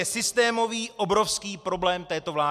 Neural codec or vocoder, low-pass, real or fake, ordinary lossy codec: none; 14.4 kHz; real; MP3, 96 kbps